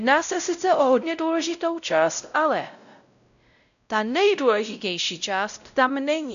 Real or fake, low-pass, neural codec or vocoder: fake; 7.2 kHz; codec, 16 kHz, 0.5 kbps, X-Codec, WavLM features, trained on Multilingual LibriSpeech